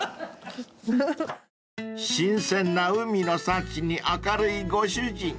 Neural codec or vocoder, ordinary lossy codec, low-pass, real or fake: none; none; none; real